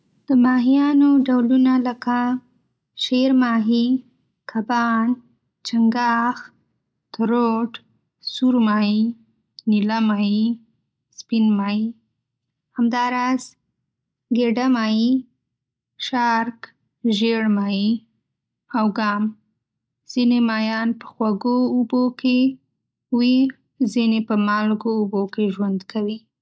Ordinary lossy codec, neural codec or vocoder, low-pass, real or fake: none; none; none; real